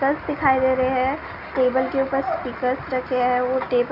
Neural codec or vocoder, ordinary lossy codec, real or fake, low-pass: none; none; real; 5.4 kHz